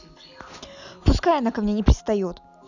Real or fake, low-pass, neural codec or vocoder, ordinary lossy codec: real; 7.2 kHz; none; none